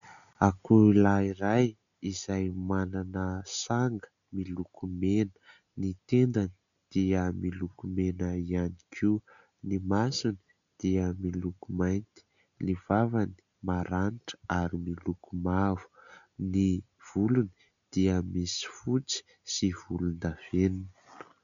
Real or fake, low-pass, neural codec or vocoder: real; 7.2 kHz; none